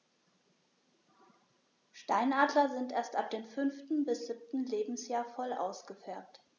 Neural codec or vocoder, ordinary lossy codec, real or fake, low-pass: none; none; real; 7.2 kHz